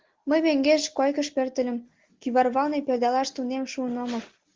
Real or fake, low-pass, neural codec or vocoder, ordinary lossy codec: real; 7.2 kHz; none; Opus, 16 kbps